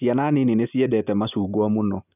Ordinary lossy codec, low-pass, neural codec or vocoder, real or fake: none; 3.6 kHz; none; real